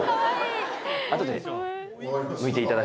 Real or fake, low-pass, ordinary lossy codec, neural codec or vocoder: real; none; none; none